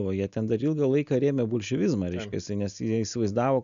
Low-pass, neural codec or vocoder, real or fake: 7.2 kHz; none; real